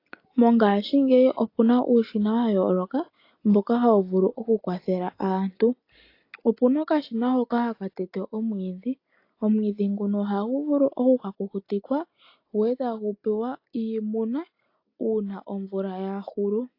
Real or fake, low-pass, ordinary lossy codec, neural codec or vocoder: real; 5.4 kHz; AAC, 32 kbps; none